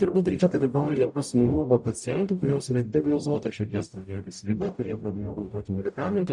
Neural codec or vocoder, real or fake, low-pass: codec, 44.1 kHz, 0.9 kbps, DAC; fake; 10.8 kHz